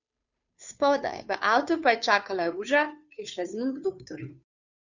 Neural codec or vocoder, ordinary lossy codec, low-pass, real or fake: codec, 16 kHz, 2 kbps, FunCodec, trained on Chinese and English, 25 frames a second; none; 7.2 kHz; fake